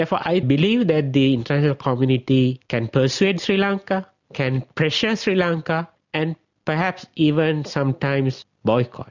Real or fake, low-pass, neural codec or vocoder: real; 7.2 kHz; none